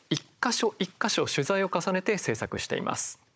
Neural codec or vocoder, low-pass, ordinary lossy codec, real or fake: codec, 16 kHz, 16 kbps, FunCodec, trained on Chinese and English, 50 frames a second; none; none; fake